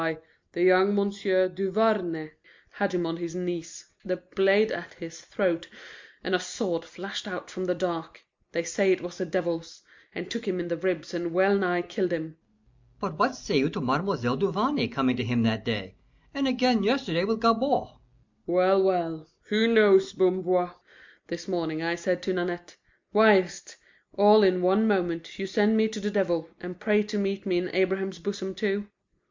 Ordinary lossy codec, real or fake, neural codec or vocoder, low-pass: MP3, 64 kbps; real; none; 7.2 kHz